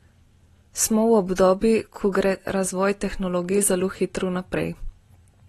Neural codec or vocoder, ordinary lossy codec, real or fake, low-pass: none; AAC, 32 kbps; real; 19.8 kHz